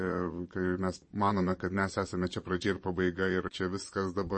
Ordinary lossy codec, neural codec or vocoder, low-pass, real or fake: MP3, 32 kbps; vocoder, 22.05 kHz, 80 mel bands, Vocos; 9.9 kHz; fake